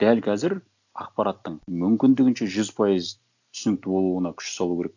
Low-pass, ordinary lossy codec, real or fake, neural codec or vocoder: 7.2 kHz; none; real; none